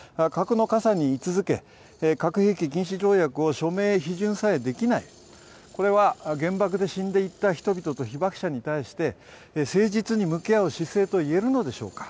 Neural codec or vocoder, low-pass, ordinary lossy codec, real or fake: none; none; none; real